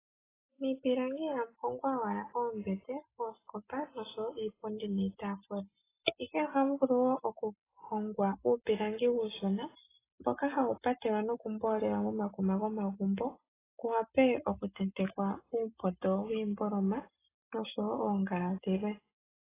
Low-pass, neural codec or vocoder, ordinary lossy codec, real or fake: 3.6 kHz; none; AAC, 16 kbps; real